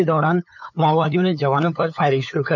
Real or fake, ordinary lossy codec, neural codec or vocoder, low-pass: fake; none; codec, 16 kHz, 8 kbps, FunCodec, trained on LibriTTS, 25 frames a second; 7.2 kHz